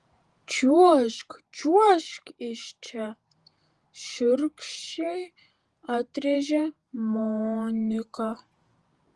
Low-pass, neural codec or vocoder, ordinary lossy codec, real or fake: 10.8 kHz; vocoder, 48 kHz, 128 mel bands, Vocos; Opus, 24 kbps; fake